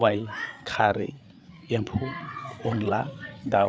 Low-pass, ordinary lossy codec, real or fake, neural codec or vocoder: none; none; fake; codec, 16 kHz, 8 kbps, FreqCodec, larger model